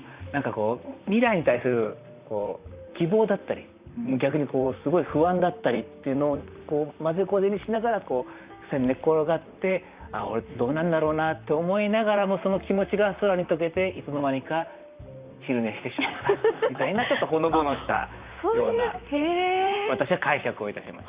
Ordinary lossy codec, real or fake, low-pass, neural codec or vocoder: Opus, 64 kbps; fake; 3.6 kHz; vocoder, 44.1 kHz, 128 mel bands, Pupu-Vocoder